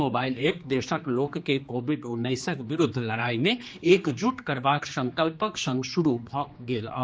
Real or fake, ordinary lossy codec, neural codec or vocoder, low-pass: fake; none; codec, 16 kHz, 2 kbps, X-Codec, HuBERT features, trained on general audio; none